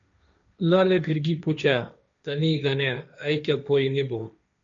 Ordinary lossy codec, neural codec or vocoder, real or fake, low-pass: MP3, 96 kbps; codec, 16 kHz, 1.1 kbps, Voila-Tokenizer; fake; 7.2 kHz